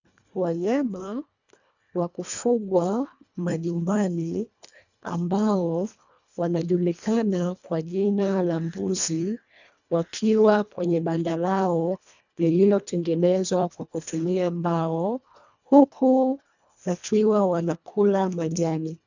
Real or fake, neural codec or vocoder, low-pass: fake; codec, 24 kHz, 1.5 kbps, HILCodec; 7.2 kHz